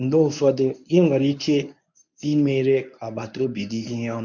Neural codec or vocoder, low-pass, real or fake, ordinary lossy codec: codec, 24 kHz, 0.9 kbps, WavTokenizer, medium speech release version 1; 7.2 kHz; fake; none